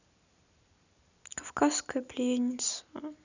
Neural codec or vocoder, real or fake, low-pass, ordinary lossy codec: none; real; 7.2 kHz; none